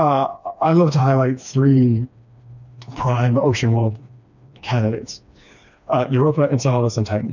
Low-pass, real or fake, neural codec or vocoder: 7.2 kHz; fake; codec, 16 kHz, 2 kbps, FreqCodec, smaller model